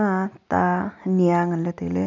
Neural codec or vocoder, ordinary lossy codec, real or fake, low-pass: none; none; real; 7.2 kHz